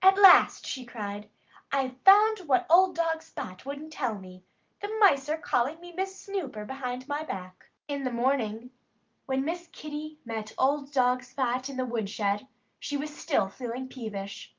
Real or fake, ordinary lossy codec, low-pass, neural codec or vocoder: real; Opus, 32 kbps; 7.2 kHz; none